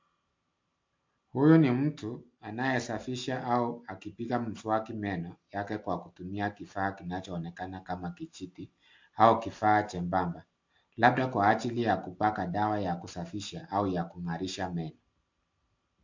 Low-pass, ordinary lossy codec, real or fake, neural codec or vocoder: 7.2 kHz; MP3, 48 kbps; real; none